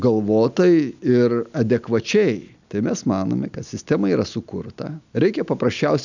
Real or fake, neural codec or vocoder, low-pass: real; none; 7.2 kHz